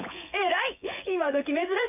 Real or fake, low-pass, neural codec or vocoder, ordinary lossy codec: fake; 3.6 kHz; vocoder, 24 kHz, 100 mel bands, Vocos; none